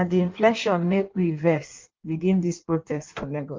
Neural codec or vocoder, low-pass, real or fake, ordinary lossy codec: codec, 16 kHz in and 24 kHz out, 1.1 kbps, FireRedTTS-2 codec; 7.2 kHz; fake; Opus, 24 kbps